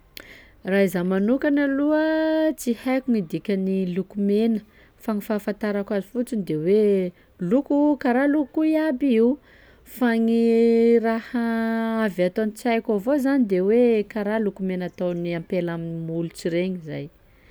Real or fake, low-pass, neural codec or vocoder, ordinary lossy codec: real; none; none; none